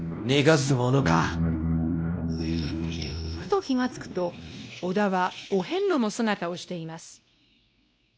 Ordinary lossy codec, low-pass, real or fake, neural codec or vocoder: none; none; fake; codec, 16 kHz, 1 kbps, X-Codec, WavLM features, trained on Multilingual LibriSpeech